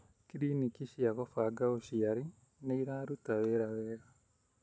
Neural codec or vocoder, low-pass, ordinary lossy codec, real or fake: none; none; none; real